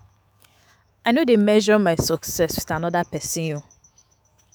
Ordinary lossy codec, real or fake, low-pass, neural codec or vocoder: none; fake; none; autoencoder, 48 kHz, 128 numbers a frame, DAC-VAE, trained on Japanese speech